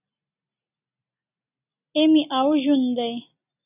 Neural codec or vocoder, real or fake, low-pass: none; real; 3.6 kHz